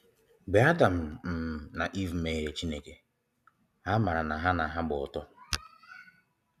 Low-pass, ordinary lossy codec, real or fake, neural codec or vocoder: 14.4 kHz; none; real; none